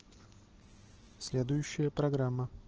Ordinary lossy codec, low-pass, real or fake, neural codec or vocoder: Opus, 16 kbps; 7.2 kHz; real; none